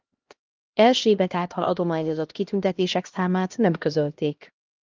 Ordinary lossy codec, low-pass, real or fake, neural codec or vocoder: Opus, 32 kbps; 7.2 kHz; fake; codec, 16 kHz, 1 kbps, X-Codec, HuBERT features, trained on LibriSpeech